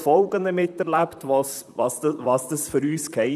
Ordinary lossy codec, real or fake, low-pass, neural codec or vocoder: none; fake; 14.4 kHz; vocoder, 44.1 kHz, 128 mel bands, Pupu-Vocoder